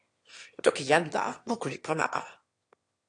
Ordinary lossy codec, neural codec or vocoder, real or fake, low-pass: AAC, 48 kbps; autoencoder, 22.05 kHz, a latent of 192 numbers a frame, VITS, trained on one speaker; fake; 9.9 kHz